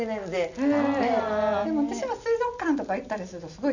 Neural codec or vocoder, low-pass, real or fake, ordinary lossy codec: none; 7.2 kHz; real; none